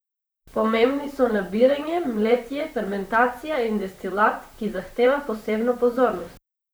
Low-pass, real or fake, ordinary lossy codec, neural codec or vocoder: none; fake; none; vocoder, 44.1 kHz, 128 mel bands, Pupu-Vocoder